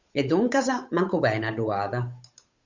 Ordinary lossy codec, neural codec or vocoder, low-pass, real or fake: Opus, 64 kbps; codec, 16 kHz, 8 kbps, FunCodec, trained on Chinese and English, 25 frames a second; 7.2 kHz; fake